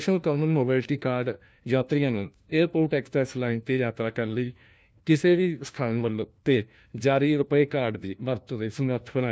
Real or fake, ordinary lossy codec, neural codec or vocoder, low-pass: fake; none; codec, 16 kHz, 1 kbps, FunCodec, trained on LibriTTS, 50 frames a second; none